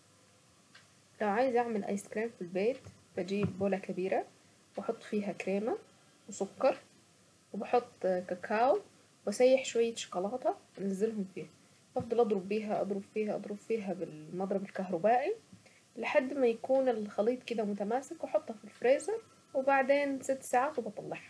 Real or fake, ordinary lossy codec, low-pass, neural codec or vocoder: real; none; none; none